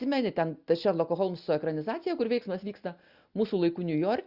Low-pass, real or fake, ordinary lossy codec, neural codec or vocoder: 5.4 kHz; real; Opus, 64 kbps; none